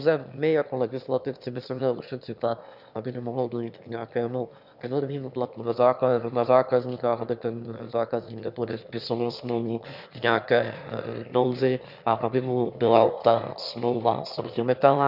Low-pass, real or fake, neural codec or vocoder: 5.4 kHz; fake; autoencoder, 22.05 kHz, a latent of 192 numbers a frame, VITS, trained on one speaker